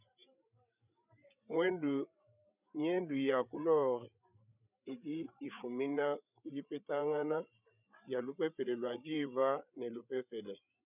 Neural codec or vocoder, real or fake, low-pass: codec, 16 kHz, 8 kbps, FreqCodec, larger model; fake; 3.6 kHz